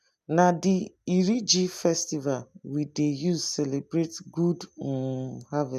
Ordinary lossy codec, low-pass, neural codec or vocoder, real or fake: none; 14.4 kHz; none; real